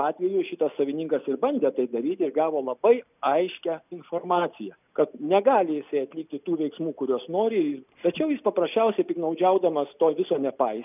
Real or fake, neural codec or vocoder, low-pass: real; none; 3.6 kHz